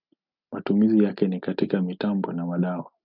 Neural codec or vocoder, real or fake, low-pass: none; real; 5.4 kHz